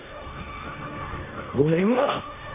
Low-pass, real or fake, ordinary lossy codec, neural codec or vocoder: 3.6 kHz; fake; none; codec, 16 kHz in and 24 kHz out, 0.9 kbps, LongCat-Audio-Codec, fine tuned four codebook decoder